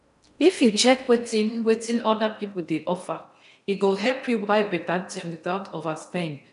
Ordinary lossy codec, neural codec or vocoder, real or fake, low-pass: none; codec, 16 kHz in and 24 kHz out, 0.6 kbps, FocalCodec, streaming, 4096 codes; fake; 10.8 kHz